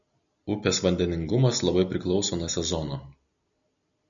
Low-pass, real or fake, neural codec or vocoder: 7.2 kHz; real; none